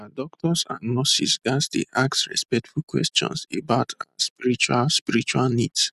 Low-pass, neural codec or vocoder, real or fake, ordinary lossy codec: 14.4 kHz; none; real; none